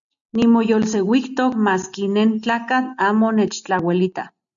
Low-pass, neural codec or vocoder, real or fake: 7.2 kHz; none; real